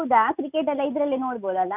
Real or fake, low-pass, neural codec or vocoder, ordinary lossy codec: real; 3.6 kHz; none; none